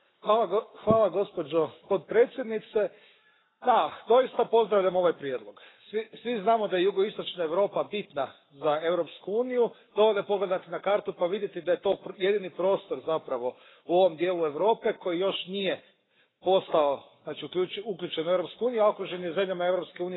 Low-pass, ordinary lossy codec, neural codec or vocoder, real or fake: 7.2 kHz; AAC, 16 kbps; codec, 44.1 kHz, 7.8 kbps, Pupu-Codec; fake